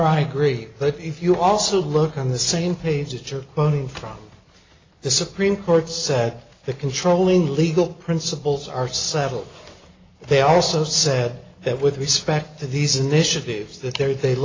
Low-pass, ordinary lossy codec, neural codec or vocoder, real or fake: 7.2 kHz; AAC, 32 kbps; none; real